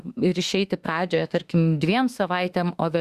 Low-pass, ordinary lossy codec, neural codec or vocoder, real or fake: 14.4 kHz; Opus, 64 kbps; autoencoder, 48 kHz, 32 numbers a frame, DAC-VAE, trained on Japanese speech; fake